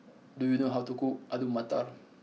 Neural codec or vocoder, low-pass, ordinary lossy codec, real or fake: none; none; none; real